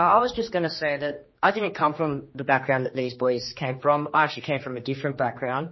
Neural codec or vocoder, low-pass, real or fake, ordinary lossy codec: codec, 16 kHz, 2 kbps, X-Codec, HuBERT features, trained on general audio; 7.2 kHz; fake; MP3, 24 kbps